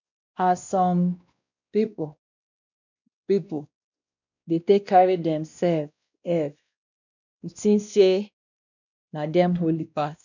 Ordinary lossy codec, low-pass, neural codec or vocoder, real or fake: none; 7.2 kHz; codec, 16 kHz, 1 kbps, X-Codec, WavLM features, trained on Multilingual LibriSpeech; fake